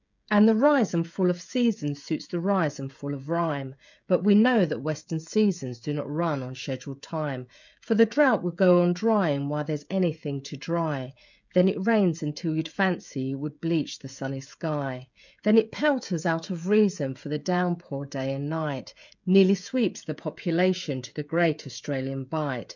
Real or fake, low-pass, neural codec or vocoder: fake; 7.2 kHz; codec, 16 kHz, 16 kbps, FreqCodec, smaller model